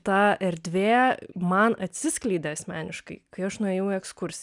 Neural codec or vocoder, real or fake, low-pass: none; real; 10.8 kHz